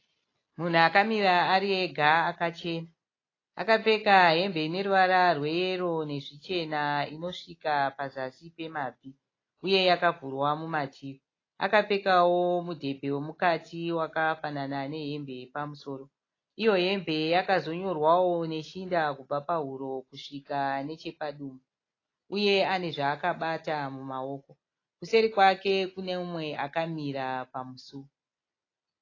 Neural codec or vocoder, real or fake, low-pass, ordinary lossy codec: none; real; 7.2 kHz; AAC, 32 kbps